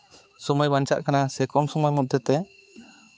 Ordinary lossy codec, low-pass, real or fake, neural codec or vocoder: none; none; fake; codec, 16 kHz, 4 kbps, X-Codec, HuBERT features, trained on balanced general audio